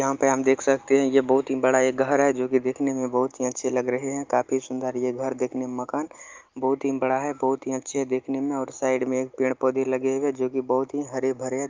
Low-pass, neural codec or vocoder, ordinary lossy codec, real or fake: 7.2 kHz; none; Opus, 32 kbps; real